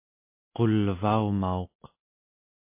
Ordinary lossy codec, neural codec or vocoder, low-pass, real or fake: MP3, 24 kbps; none; 3.6 kHz; real